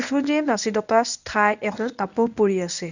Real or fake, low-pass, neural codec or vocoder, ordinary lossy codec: fake; 7.2 kHz; codec, 24 kHz, 0.9 kbps, WavTokenizer, medium speech release version 1; none